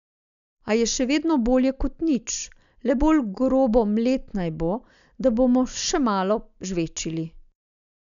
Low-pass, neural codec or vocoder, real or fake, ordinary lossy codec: 7.2 kHz; none; real; none